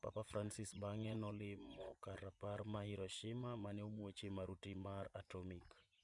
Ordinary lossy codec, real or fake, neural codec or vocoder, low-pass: none; fake; vocoder, 24 kHz, 100 mel bands, Vocos; 10.8 kHz